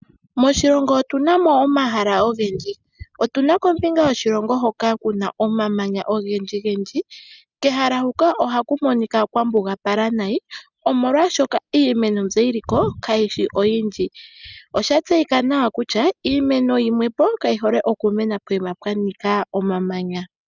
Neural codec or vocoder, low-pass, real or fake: none; 7.2 kHz; real